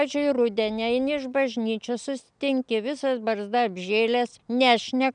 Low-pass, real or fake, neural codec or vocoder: 9.9 kHz; real; none